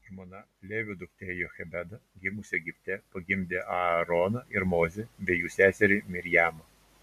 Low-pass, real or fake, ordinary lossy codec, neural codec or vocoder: 14.4 kHz; real; MP3, 96 kbps; none